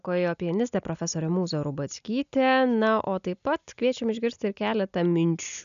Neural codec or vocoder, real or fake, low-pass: none; real; 7.2 kHz